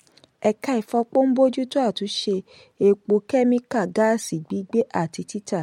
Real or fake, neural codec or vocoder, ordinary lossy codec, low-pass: real; none; MP3, 64 kbps; 19.8 kHz